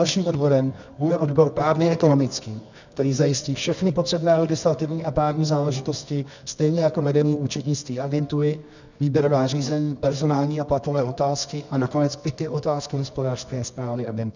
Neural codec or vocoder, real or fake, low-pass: codec, 24 kHz, 0.9 kbps, WavTokenizer, medium music audio release; fake; 7.2 kHz